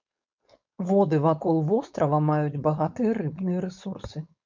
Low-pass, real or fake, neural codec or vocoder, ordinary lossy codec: 7.2 kHz; fake; codec, 16 kHz, 4.8 kbps, FACodec; MP3, 64 kbps